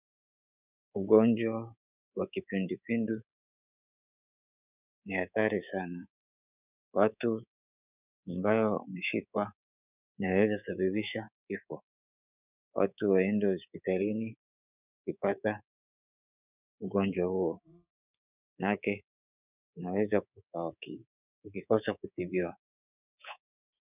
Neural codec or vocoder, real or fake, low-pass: codec, 24 kHz, 3.1 kbps, DualCodec; fake; 3.6 kHz